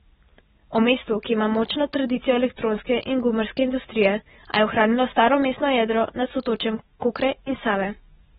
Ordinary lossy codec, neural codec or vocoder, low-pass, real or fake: AAC, 16 kbps; none; 19.8 kHz; real